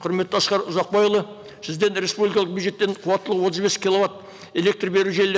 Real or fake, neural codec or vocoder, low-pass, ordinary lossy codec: real; none; none; none